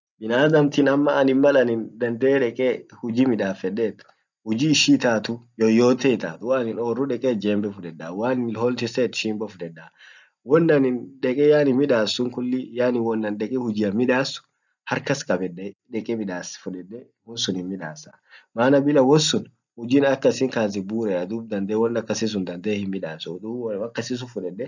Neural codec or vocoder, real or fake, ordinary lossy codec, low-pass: none; real; none; 7.2 kHz